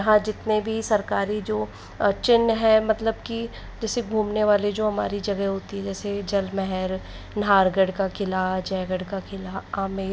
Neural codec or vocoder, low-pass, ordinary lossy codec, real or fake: none; none; none; real